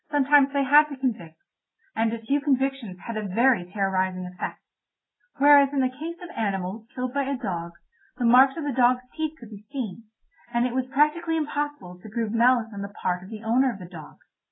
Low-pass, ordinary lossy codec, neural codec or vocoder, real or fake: 7.2 kHz; AAC, 16 kbps; none; real